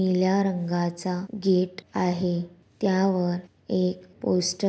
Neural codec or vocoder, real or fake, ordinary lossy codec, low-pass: none; real; none; none